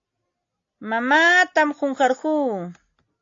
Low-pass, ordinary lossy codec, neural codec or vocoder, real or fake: 7.2 kHz; AAC, 48 kbps; none; real